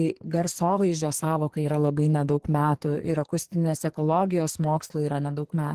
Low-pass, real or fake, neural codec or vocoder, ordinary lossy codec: 14.4 kHz; fake; codec, 44.1 kHz, 2.6 kbps, SNAC; Opus, 16 kbps